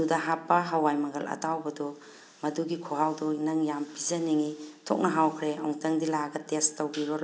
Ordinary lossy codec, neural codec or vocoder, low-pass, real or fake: none; none; none; real